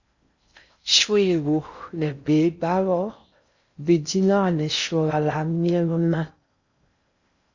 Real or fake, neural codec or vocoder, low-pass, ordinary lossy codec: fake; codec, 16 kHz in and 24 kHz out, 0.6 kbps, FocalCodec, streaming, 4096 codes; 7.2 kHz; Opus, 64 kbps